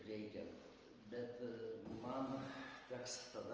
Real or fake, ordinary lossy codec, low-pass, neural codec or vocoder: real; Opus, 24 kbps; 7.2 kHz; none